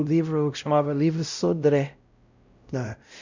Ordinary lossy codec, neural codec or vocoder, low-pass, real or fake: Opus, 64 kbps; codec, 16 kHz, 0.5 kbps, X-Codec, WavLM features, trained on Multilingual LibriSpeech; 7.2 kHz; fake